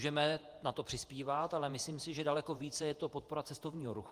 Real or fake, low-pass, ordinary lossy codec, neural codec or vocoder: fake; 14.4 kHz; Opus, 32 kbps; vocoder, 48 kHz, 128 mel bands, Vocos